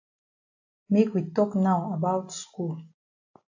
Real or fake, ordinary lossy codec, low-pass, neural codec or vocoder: real; AAC, 48 kbps; 7.2 kHz; none